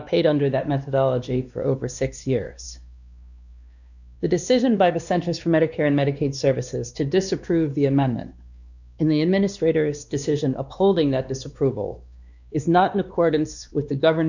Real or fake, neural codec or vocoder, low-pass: fake; codec, 16 kHz, 2 kbps, X-Codec, WavLM features, trained on Multilingual LibriSpeech; 7.2 kHz